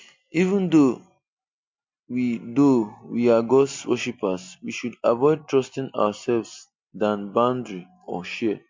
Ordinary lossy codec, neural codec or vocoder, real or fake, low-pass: MP3, 48 kbps; none; real; 7.2 kHz